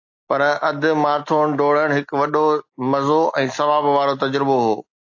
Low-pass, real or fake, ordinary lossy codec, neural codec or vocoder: 7.2 kHz; real; AAC, 48 kbps; none